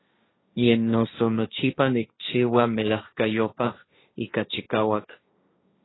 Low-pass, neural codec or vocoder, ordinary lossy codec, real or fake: 7.2 kHz; codec, 16 kHz, 1.1 kbps, Voila-Tokenizer; AAC, 16 kbps; fake